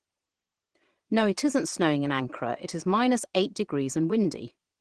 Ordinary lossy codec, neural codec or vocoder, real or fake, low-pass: Opus, 16 kbps; vocoder, 22.05 kHz, 80 mel bands, Vocos; fake; 9.9 kHz